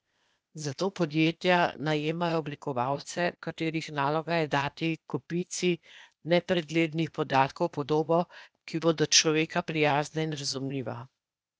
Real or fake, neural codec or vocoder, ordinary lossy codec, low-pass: fake; codec, 16 kHz, 0.8 kbps, ZipCodec; none; none